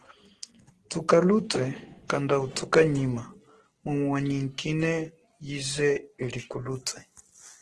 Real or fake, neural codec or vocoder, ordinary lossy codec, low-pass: real; none; Opus, 16 kbps; 10.8 kHz